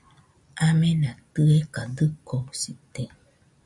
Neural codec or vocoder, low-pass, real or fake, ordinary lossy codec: none; 10.8 kHz; real; MP3, 96 kbps